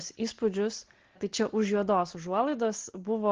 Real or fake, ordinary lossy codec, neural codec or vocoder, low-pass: real; Opus, 16 kbps; none; 7.2 kHz